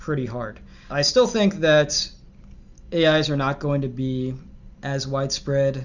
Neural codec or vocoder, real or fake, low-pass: none; real; 7.2 kHz